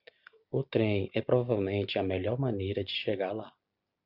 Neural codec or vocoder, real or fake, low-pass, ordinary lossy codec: none; real; 5.4 kHz; Opus, 64 kbps